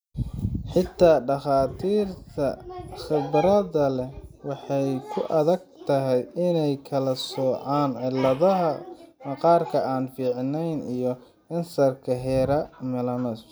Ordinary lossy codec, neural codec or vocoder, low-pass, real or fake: none; none; none; real